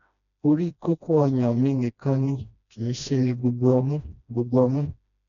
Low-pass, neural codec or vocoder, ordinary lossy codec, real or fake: 7.2 kHz; codec, 16 kHz, 1 kbps, FreqCodec, smaller model; none; fake